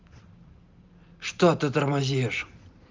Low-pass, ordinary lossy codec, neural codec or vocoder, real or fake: 7.2 kHz; Opus, 24 kbps; none; real